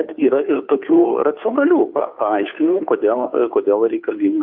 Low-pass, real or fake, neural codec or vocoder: 5.4 kHz; fake; codec, 16 kHz, 2 kbps, FunCodec, trained on Chinese and English, 25 frames a second